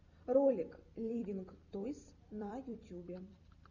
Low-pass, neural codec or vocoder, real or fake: 7.2 kHz; vocoder, 44.1 kHz, 128 mel bands every 256 samples, BigVGAN v2; fake